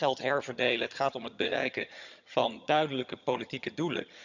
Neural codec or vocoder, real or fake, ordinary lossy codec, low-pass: vocoder, 22.05 kHz, 80 mel bands, HiFi-GAN; fake; none; 7.2 kHz